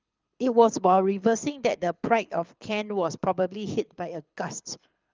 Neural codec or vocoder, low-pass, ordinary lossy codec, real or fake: codec, 24 kHz, 6 kbps, HILCodec; 7.2 kHz; Opus, 24 kbps; fake